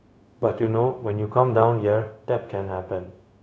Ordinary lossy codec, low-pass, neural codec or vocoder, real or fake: none; none; codec, 16 kHz, 0.4 kbps, LongCat-Audio-Codec; fake